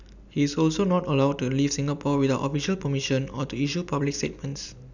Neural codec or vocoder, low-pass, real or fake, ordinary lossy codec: none; 7.2 kHz; real; none